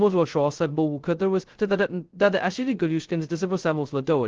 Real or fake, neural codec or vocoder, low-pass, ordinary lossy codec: fake; codec, 16 kHz, 0.2 kbps, FocalCodec; 7.2 kHz; Opus, 16 kbps